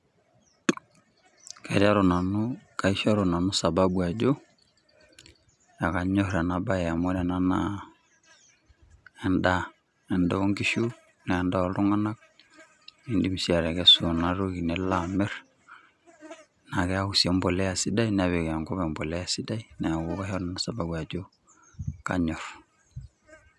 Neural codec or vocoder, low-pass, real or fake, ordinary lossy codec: none; none; real; none